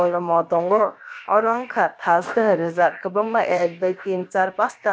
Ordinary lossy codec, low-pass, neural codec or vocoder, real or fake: none; none; codec, 16 kHz, about 1 kbps, DyCAST, with the encoder's durations; fake